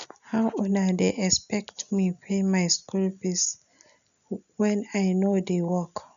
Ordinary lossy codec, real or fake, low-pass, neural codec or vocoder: none; real; 7.2 kHz; none